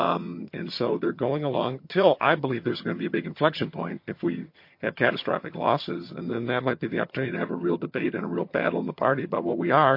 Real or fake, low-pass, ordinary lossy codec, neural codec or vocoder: fake; 5.4 kHz; MP3, 32 kbps; vocoder, 22.05 kHz, 80 mel bands, HiFi-GAN